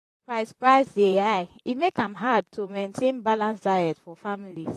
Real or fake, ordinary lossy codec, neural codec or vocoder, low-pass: fake; AAC, 48 kbps; vocoder, 44.1 kHz, 128 mel bands, Pupu-Vocoder; 14.4 kHz